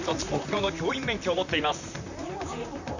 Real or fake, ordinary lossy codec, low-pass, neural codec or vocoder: fake; none; 7.2 kHz; vocoder, 44.1 kHz, 128 mel bands, Pupu-Vocoder